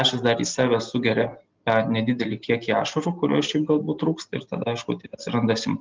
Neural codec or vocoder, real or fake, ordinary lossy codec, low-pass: none; real; Opus, 32 kbps; 7.2 kHz